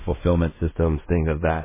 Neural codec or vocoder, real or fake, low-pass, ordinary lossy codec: codec, 16 kHz in and 24 kHz out, 0.4 kbps, LongCat-Audio-Codec, fine tuned four codebook decoder; fake; 3.6 kHz; MP3, 16 kbps